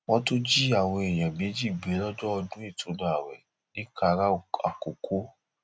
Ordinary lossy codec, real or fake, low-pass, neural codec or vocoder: none; real; none; none